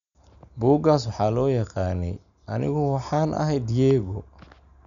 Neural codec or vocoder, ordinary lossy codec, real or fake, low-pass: none; none; real; 7.2 kHz